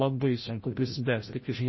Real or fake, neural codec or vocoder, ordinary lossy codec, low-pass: fake; codec, 16 kHz, 0.5 kbps, FreqCodec, larger model; MP3, 24 kbps; 7.2 kHz